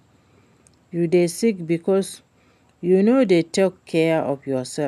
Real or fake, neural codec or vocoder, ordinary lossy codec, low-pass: real; none; none; 14.4 kHz